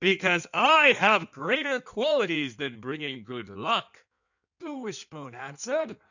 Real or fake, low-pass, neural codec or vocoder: fake; 7.2 kHz; codec, 16 kHz in and 24 kHz out, 1.1 kbps, FireRedTTS-2 codec